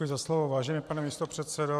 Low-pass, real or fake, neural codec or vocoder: 14.4 kHz; fake; vocoder, 44.1 kHz, 128 mel bands every 512 samples, BigVGAN v2